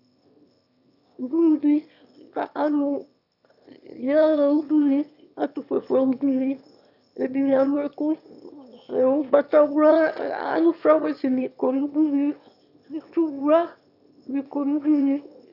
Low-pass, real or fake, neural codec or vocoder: 5.4 kHz; fake; autoencoder, 22.05 kHz, a latent of 192 numbers a frame, VITS, trained on one speaker